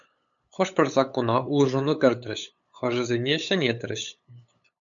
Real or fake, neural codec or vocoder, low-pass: fake; codec, 16 kHz, 8 kbps, FunCodec, trained on LibriTTS, 25 frames a second; 7.2 kHz